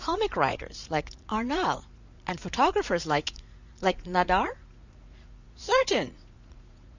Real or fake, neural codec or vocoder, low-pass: fake; vocoder, 44.1 kHz, 80 mel bands, Vocos; 7.2 kHz